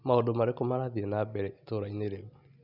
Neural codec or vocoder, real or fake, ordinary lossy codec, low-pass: none; real; none; 5.4 kHz